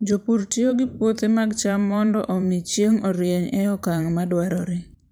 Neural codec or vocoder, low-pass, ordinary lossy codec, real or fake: vocoder, 44.1 kHz, 128 mel bands every 512 samples, BigVGAN v2; none; none; fake